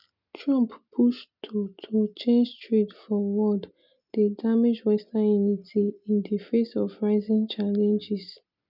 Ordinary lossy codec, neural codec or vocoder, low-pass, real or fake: none; none; 5.4 kHz; real